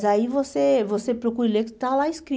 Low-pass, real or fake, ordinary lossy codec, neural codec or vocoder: none; real; none; none